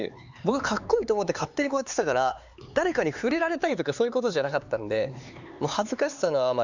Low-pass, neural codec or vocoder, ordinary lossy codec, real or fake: 7.2 kHz; codec, 16 kHz, 4 kbps, X-Codec, HuBERT features, trained on LibriSpeech; Opus, 64 kbps; fake